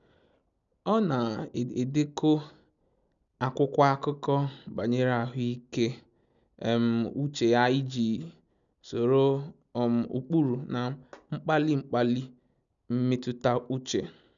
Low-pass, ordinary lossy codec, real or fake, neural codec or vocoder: 7.2 kHz; none; real; none